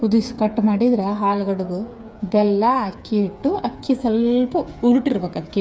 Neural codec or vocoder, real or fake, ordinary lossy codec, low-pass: codec, 16 kHz, 8 kbps, FreqCodec, smaller model; fake; none; none